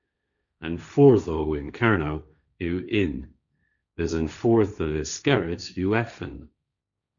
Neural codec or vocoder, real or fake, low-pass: codec, 16 kHz, 1.1 kbps, Voila-Tokenizer; fake; 7.2 kHz